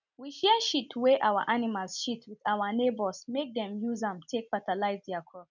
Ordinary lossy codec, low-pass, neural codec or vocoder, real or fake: none; 7.2 kHz; none; real